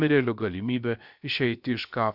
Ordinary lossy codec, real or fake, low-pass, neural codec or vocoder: Opus, 64 kbps; fake; 5.4 kHz; codec, 16 kHz, about 1 kbps, DyCAST, with the encoder's durations